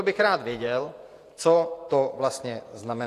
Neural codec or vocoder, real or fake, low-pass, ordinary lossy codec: vocoder, 48 kHz, 128 mel bands, Vocos; fake; 14.4 kHz; AAC, 64 kbps